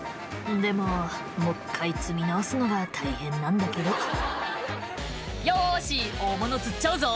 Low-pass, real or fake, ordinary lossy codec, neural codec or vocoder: none; real; none; none